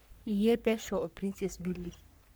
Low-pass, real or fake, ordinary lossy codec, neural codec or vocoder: none; fake; none; codec, 44.1 kHz, 3.4 kbps, Pupu-Codec